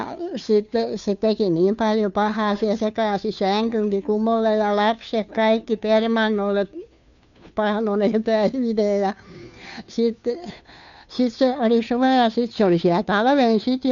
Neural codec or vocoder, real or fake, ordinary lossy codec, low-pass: codec, 16 kHz, 2 kbps, FunCodec, trained on Chinese and English, 25 frames a second; fake; none; 7.2 kHz